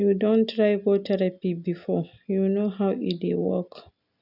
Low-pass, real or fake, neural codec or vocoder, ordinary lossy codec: 5.4 kHz; real; none; none